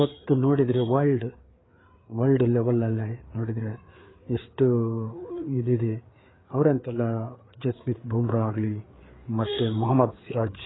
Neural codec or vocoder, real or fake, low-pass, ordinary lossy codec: codec, 16 kHz, 4 kbps, FreqCodec, larger model; fake; 7.2 kHz; AAC, 16 kbps